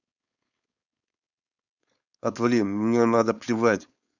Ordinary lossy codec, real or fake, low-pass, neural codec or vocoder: none; fake; 7.2 kHz; codec, 16 kHz, 4.8 kbps, FACodec